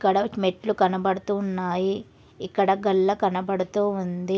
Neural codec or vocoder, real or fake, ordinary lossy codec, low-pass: none; real; none; none